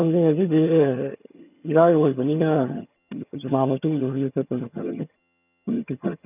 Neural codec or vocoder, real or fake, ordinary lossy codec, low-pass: vocoder, 22.05 kHz, 80 mel bands, HiFi-GAN; fake; none; 3.6 kHz